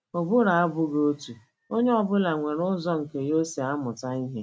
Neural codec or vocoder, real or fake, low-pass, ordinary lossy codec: none; real; none; none